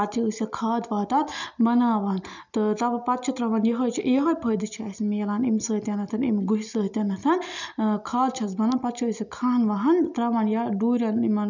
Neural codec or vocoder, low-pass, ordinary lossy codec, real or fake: none; 7.2 kHz; none; real